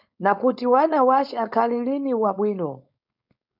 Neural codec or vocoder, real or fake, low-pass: codec, 16 kHz, 4.8 kbps, FACodec; fake; 5.4 kHz